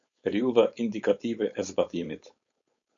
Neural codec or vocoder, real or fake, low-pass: codec, 16 kHz, 4.8 kbps, FACodec; fake; 7.2 kHz